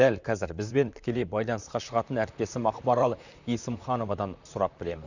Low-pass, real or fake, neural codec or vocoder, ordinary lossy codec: 7.2 kHz; fake; vocoder, 44.1 kHz, 128 mel bands, Pupu-Vocoder; none